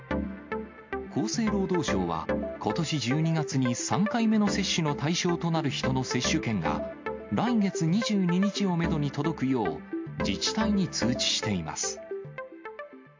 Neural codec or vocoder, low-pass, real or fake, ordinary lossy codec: none; 7.2 kHz; real; MP3, 48 kbps